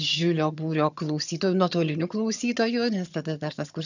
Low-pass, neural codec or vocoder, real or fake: 7.2 kHz; vocoder, 22.05 kHz, 80 mel bands, HiFi-GAN; fake